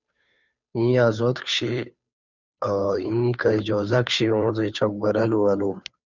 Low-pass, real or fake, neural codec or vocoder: 7.2 kHz; fake; codec, 16 kHz, 2 kbps, FunCodec, trained on Chinese and English, 25 frames a second